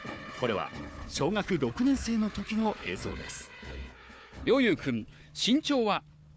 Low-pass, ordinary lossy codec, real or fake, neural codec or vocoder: none; none; fake; codec, 16 kHz, 4 kbps, FunCodec, trained on Chinese and English, 50 frames a second